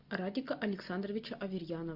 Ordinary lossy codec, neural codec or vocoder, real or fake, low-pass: Opus, 64 kbps; none; real; 5.4 kHz